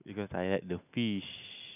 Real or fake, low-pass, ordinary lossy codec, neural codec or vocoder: real; 3.6 kHz; none; none